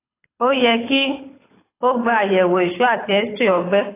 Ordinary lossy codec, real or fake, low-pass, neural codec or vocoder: AAC, 16 kbps; fake; 3.6 kHz; codec, 24 kHz, 6 kbps, HILCodec